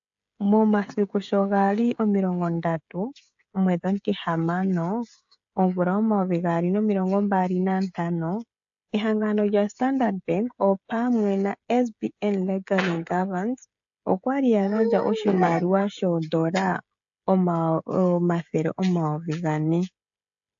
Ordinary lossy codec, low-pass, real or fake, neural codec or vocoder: AAC, 64 kbps; 7.2 kHz; fake; codec, 16 kHz, 16 kbps, FreqCodec, smaller model